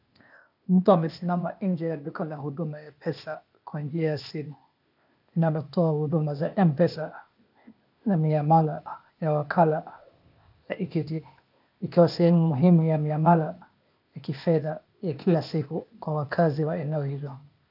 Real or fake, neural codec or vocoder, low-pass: fake; codec, 16 kHz, 0.8 kbps, ZipCodec; 5.4 kHz